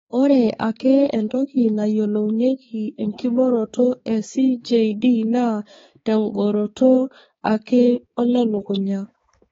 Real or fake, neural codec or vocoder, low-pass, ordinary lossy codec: fake; codec, 16 kHz, 4 kbps, X-Codec, HuBERT features, trained on balanced general audio; 7.2 kHz; AAC, 24 kbps